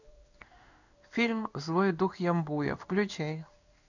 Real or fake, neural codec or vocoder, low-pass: fake; codec, 16 kHz in and 24 kHz out, 1 kbps, XY-Tokenizer; 7.2 kHz